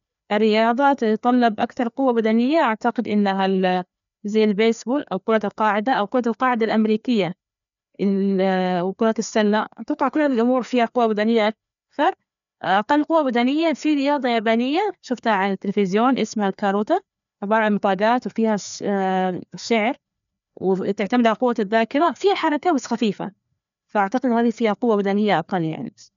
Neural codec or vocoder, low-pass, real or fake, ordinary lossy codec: codec, 16 kHz, 2 kbps, FreqCodec, larger model; 7.2 kHz; fake; MP3, 96 kbps